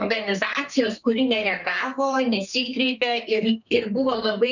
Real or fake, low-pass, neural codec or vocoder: fake; 7.2 kHz; codec, 32 kHz, 1.9 kbps, SNAC